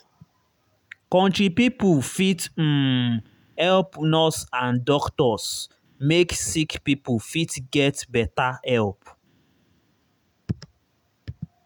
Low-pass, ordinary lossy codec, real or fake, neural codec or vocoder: none; none; real; none